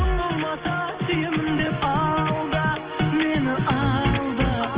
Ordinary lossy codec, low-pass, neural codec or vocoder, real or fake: Opus, 16 kbps; 3.6 kHz; none; real